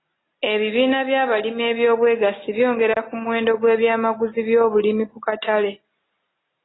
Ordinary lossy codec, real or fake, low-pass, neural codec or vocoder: AAC, 16 kbps; real; 7.2 kHz; none